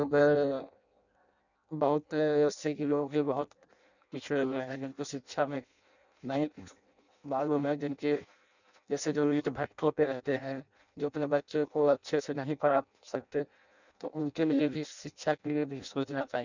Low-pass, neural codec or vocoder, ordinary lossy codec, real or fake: 7.2 kHz; codec, 16 kHz in and 24 kHz out, 0.6 kbps, FireRedTTS-2 codec; none; fake